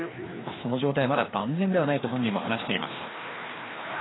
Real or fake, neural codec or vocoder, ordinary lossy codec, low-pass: fake; codec, 16 kHz, 2 kbps, FreqCodec, larger model; AAC, 16 kbps; 7.2 kHz